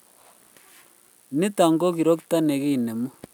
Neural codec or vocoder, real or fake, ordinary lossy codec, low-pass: none; real; none; none